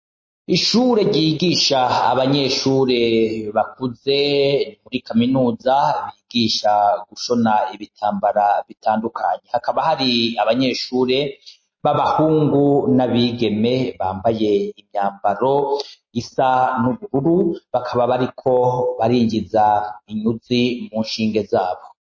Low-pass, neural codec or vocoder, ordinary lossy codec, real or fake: 7.2 kHz; none; MP3, 32 kbps; real